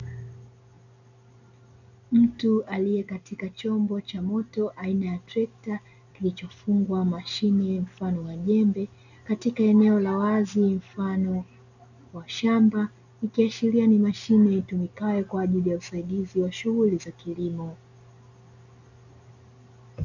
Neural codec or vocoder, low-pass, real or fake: none; 7.2 kHz; real